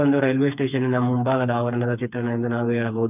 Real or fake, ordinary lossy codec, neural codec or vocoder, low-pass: fake; none; codec, 16 kHz, 4 kbps, FreqCodec, smaller model; 3.6 kHz